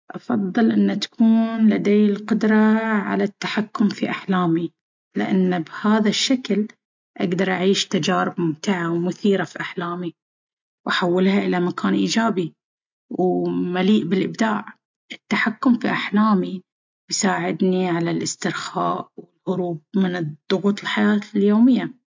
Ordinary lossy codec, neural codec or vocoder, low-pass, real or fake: MP3, 64 kbps; none; 7.2 kHz; real